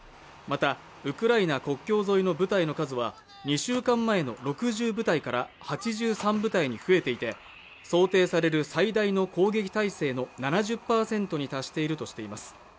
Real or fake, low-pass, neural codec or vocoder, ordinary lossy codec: real; none; none; none